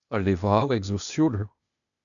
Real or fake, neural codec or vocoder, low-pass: fake; codec, 16 kHz, 0.8 kbps, ZipCodec; 7.2 kHz